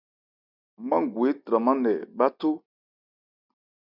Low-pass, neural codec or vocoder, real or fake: 5.4 kHz; none; real